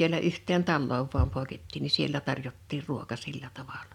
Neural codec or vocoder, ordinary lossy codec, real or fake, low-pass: none; none; real; 19.8 kHz